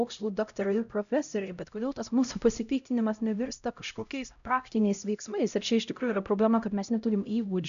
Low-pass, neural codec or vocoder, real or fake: 7.2 kHz; codec, 16 kHz, 0.5 kbps, X-Codec, HuBERT features, trained on LibriSpeech; fake